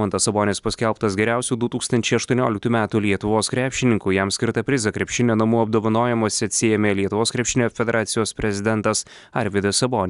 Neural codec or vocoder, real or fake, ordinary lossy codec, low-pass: none; real; Opus, 64 kbps; 10.8 kHz